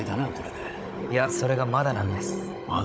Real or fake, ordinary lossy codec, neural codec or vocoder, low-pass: fake; none; codec, 16 kHz, 16 kbps, FunCodec, trained on Chinese and English, 50 frames a second; none